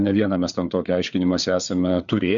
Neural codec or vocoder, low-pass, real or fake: codec, 16 kHz, 4 kbps, FreqCodec, larger model; 7.2 kHz; fake